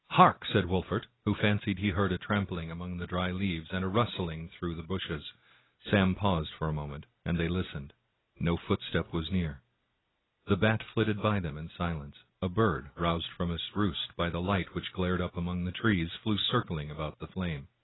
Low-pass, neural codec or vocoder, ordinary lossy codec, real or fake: 7.2 kHz; none; AAC, 16 kbps; real